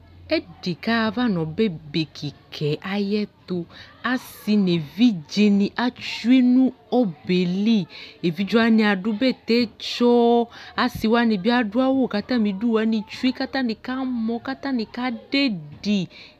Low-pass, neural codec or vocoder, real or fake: 14.4 kHz; none; real